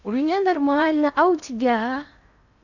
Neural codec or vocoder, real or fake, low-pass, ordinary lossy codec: codec, 16 kHz in and 24 kHz out, 0.8 kbps, FocalCodec, streaming, 65536 codes; fake; 7.2 kHz; none